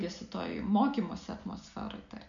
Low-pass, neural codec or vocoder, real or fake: 7.2 kHz; none; real